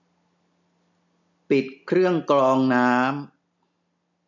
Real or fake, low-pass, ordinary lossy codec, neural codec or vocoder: real; 7.2 kHz; none; none